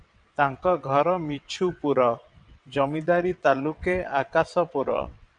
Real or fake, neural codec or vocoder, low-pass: fake; vocoder, 22.05 kHz, 80 mel bands, WaveNeXt; 9.9 kHz